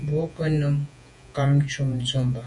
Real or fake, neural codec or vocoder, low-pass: fake; vocoder, 48 kHz, 128 mel bands, Vocos; 10.8 kHz